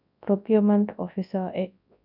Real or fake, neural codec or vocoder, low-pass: fake; codec, 24 kHz, 0.9 kbps, WavTokenizer, large speech release; 5.4 kHz